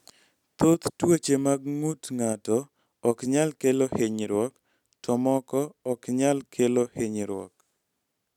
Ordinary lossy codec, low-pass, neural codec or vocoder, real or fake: none; 19.8 kHz; none; real